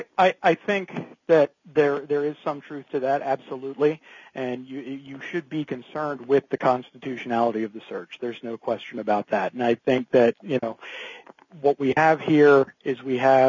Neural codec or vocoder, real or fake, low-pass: none; real; 7.2 kHz